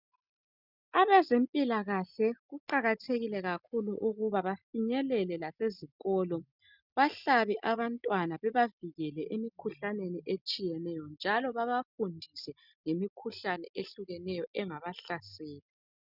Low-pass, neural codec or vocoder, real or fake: 5.4 kHz; none; real